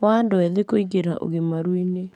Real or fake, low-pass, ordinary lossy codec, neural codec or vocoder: fake; 19.8 kHz; none; codec, 44.1 kHz, 7.8 kbps, DAC